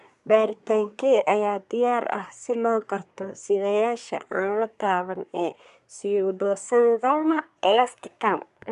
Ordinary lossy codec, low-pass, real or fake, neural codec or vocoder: none; 10.8 kHz; fake; codec, 24 kHz, 1 kbps, SNAC